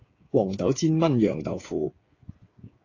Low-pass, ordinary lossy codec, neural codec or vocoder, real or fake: 7.2 kHz; AAC, 32 kbps; codec, 16 kHz, 8 kbps, FreqCodec, smaller model; fake